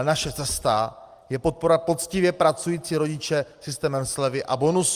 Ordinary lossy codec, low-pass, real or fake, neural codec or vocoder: Opus, 32 kbps; 14.4 kHz; real; none